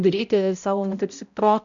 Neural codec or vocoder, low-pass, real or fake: codec, 16 kHz, 0.5 kbps, X-Codec, HuBERT features, trained on balanced general audio; 7.2 kHz; fake